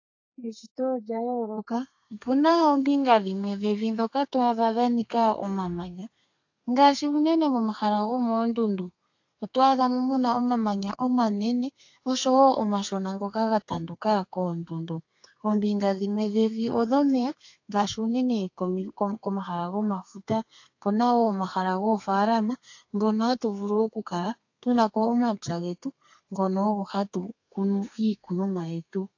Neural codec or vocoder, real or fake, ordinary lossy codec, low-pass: codec, 32 kHz, 1.9 kbps, SNAC; fake; AAC, 48 kbps; 7.2 kHz